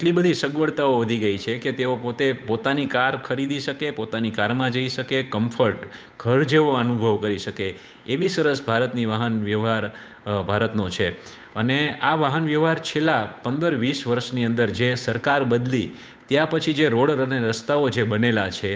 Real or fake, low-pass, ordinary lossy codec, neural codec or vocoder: fake; none; none; codec, 16 kHz, 8 kbps, FunCodec, trained on Chinese and English, 25 frames a second